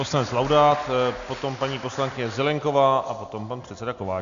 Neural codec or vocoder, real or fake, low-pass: none; real; 7.2 kHz